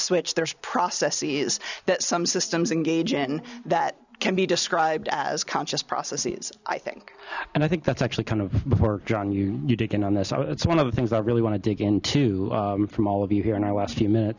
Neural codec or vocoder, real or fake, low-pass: none; real; 7.2 kHz